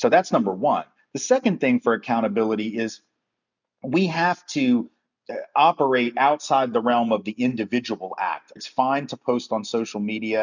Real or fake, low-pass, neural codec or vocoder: real; 7.2 kHz; none